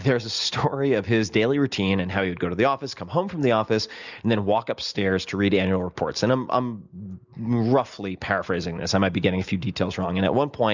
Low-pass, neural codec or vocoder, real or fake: 7.2 kHz; none; real